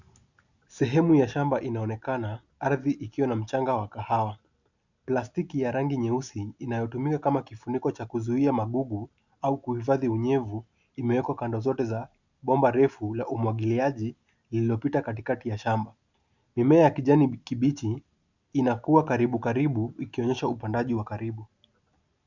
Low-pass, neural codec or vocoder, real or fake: 7.2 kHz; none; real